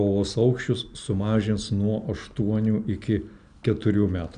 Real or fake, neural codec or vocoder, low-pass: real; none; 9.9 kHz